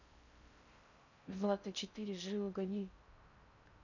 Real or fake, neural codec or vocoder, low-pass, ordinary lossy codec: fake; codec, 16 kHz in and 24 kHz out, 0.6 kbps, FocalCodec, streaming, 4096 codes; 7.2 kHz; none